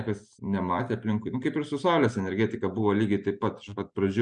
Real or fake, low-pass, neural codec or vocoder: real; 9.9 kHz; none